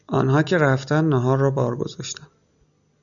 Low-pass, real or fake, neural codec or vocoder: 7.2 kHz; real; none